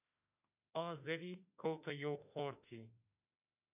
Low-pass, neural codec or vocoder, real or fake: 3.6 kHz; autoencoder, 48 kHz, 32 numbers a frame, DAC-VAE, trained on Japanese speech; fake